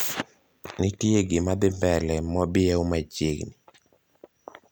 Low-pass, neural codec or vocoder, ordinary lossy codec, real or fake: none; none; none; real